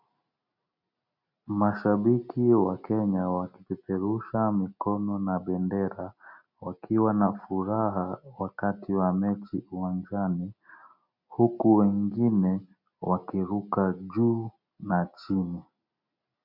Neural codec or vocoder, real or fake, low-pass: none; real; 5.4 kHz